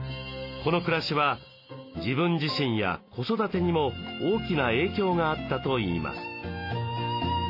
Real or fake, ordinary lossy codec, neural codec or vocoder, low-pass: real; AAC, 32 kbps; none; 5.4 kHz